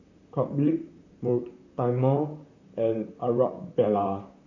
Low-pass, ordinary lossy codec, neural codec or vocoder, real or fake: 7.2 kHz; none; vocoder, 44.1 kHz, 128 mel bands, Pupu-Vocoder; fake